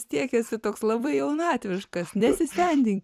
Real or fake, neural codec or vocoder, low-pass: fake; vocoder, 44.1 kHz, 128 mel bands every 256 samples, BigVGAN v2; 14.4 kHz